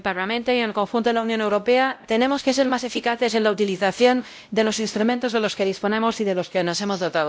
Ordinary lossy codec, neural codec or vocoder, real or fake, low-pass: none; codec, 16 kHz, 0.5 kbps, X-Codec, WavLM features, trained on Multilingual LibriSpeech; fake; none